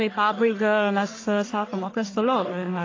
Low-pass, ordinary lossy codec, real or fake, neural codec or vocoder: 7.2 kHz; AAC, 32 kbps; fake; codec, 44.1 kHz, 1.7 kbps, Pupu-Codec